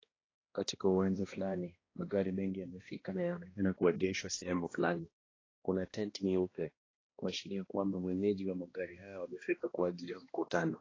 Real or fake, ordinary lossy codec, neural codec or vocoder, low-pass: fake; AAC, 32 kbps; codec, 16 kHz, 1 kbps, X-Codec, HuBERT features, trained on balanced general audio; 7.2 kHz